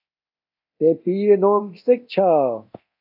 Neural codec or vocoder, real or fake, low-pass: codec, 24 kHz, 0.9 kbps, DualCodec; fake; 5.4 kHz